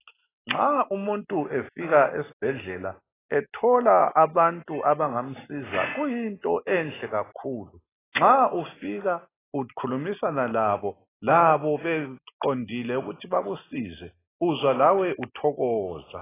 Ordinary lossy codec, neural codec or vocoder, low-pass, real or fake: AAC, 16 kbps; none; 3.6 kHz; real